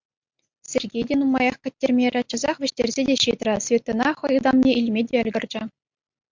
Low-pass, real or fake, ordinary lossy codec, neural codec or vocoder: 7.2 kHz; real; MP3, 64 kbps; none